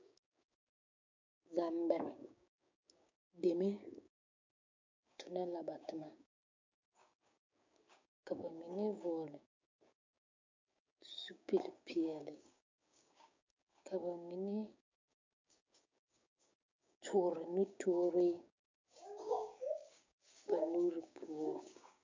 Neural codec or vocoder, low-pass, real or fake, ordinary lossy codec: codec, 16 kHz, 6 kbps, DAC; 7.2 kHz; fake; MP3, 48 kbps